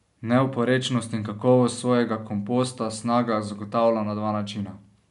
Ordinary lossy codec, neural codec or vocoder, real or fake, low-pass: none; none; real; 10.8 kHz